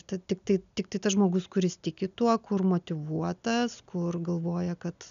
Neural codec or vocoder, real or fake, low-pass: none; real; 7.2 kHz